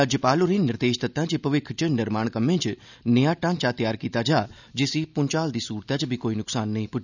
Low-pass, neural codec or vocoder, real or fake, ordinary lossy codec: 7.2 kHz; none; real; none